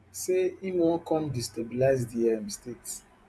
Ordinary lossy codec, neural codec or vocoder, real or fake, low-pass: none; vocoder, 24 kHz, 100 mel bands, Vocos; fake; none